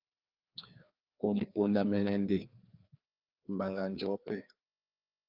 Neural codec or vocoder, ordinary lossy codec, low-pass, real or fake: codec, 16 kHz, 2 kbps, FreqCodec, larger model; Opus, 24 kbps; 5.4 kHz; fake